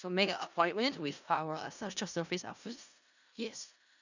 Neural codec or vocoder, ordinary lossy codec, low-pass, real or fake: codec, 16 kHz in and 24 kHz out, 0.4 kbps, LongCat-Audio-Codec, four codebook decoder; none; 7.2 kHz; fake